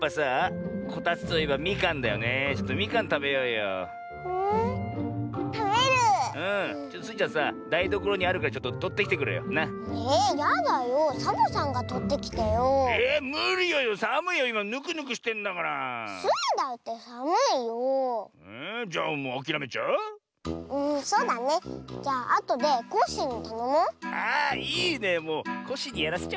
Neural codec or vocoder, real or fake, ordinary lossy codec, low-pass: none; real; none; none